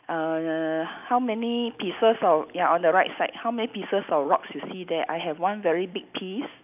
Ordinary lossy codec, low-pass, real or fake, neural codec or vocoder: none; 3.6 kHz; real; none